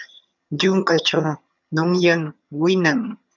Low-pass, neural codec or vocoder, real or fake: 7.2 kHz; vocoder, 22.05 kHz, 80 mel bands, HiFi-GAN; fake